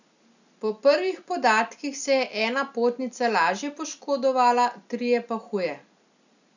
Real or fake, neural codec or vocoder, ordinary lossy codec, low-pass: real; none; none; 7.2 kHz